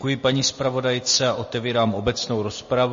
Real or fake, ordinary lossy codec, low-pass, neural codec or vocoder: real; MP3, 32 kbps; 7.2 kHz; none